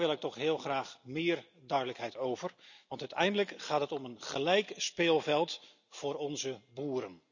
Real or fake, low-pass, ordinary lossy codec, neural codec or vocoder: real; 7.2 kHz; none; none